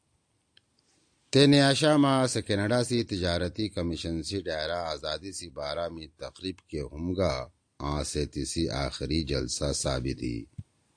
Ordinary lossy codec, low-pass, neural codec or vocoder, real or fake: AAC, 64 kbps; 9.9 kHz; none; real